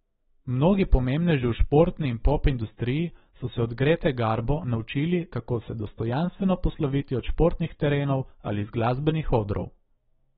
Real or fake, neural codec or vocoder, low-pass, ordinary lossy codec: fake; autoencoder, 48 kHz, 128 numbers a frame, DAC-VAE, trained on Japanese speech; 19.8 kHz; AAC, 16 kbps